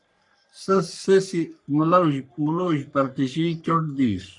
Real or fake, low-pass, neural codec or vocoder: fake; 10.8 kHz; codec, 44.1 kHz, 3.4 kbps, Pupu-Codec